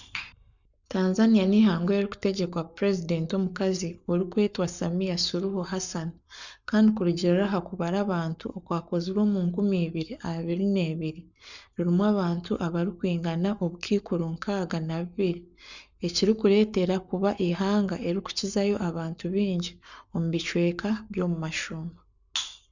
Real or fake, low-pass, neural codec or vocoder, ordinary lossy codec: fake; 7.2 kHz; codec, 44.1 kHz, 7.8 kbps, Pupu-Codec; none